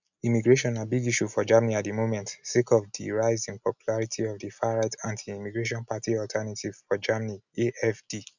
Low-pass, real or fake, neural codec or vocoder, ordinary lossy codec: 7.2 kHz; real; none; none